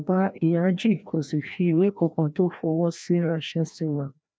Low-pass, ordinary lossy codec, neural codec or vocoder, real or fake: none; none; codec, 16 kHz, 1 kbps, FreqCodec, larger model; fake